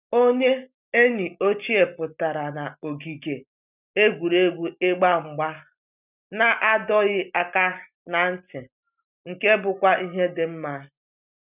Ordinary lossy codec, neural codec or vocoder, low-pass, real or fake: none; none; 3.6 kHz; real